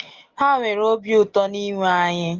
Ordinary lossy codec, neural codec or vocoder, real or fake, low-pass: Opus, 16 kbps; none; real; 7.2 kHz